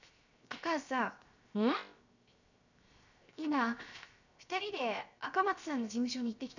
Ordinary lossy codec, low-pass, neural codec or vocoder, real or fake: none; 7.2 kHz; codec, 16 kHz, 0.7 kbps, FocalCodec; fake